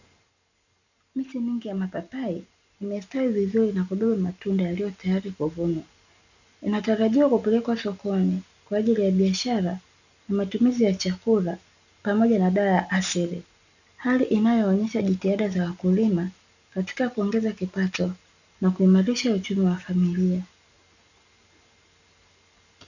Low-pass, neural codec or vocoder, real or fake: 7.2 kHz; none; real